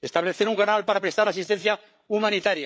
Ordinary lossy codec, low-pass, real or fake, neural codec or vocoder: none; none; fake; codec, 16 kHz, 8 kbps, FreqCodec, larger model